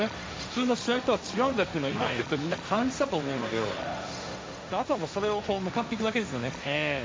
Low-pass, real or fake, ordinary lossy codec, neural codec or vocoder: none; fake; none; codec, 16 kHz, 1.1 kbps, Voila-Tokenizer